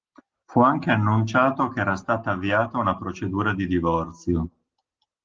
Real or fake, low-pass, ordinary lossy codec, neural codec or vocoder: real; 7.2 kHz; Opus, 16 kbps; none